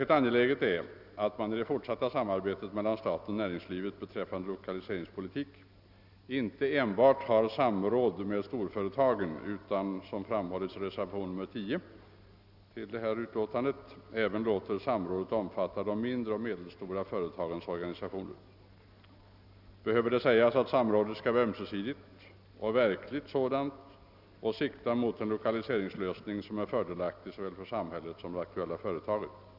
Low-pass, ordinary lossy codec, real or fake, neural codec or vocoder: 5.4 kHz; none; real; none